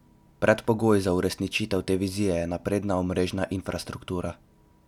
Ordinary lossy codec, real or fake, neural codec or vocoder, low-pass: none; real; none; 19.8 kHz